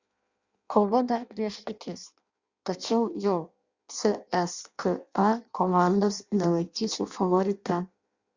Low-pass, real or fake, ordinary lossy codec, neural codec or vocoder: 7.2 kHz; fake; Opus, 64 kbps; codec, 16 kHz in and 24 kHz out, 0.6 kbps, FireRedTTS-2 codec